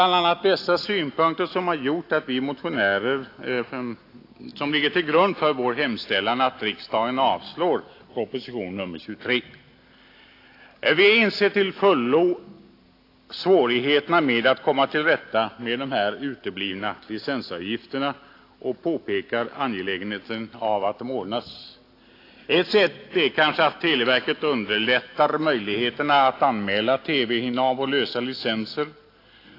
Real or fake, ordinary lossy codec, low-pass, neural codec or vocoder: real; AAC, 32 kbps; 5.4 kHz; none